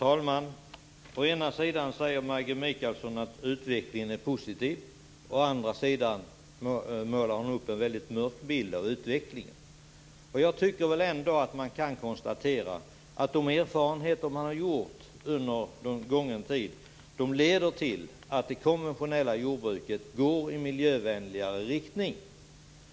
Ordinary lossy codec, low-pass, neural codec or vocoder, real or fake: none; none; none; real